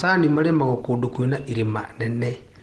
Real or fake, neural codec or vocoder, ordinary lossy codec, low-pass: real; none; Opus, 16 kbps; 10.8 kHz